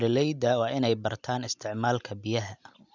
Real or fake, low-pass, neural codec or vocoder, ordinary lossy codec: real; 7.2 kHz; none; none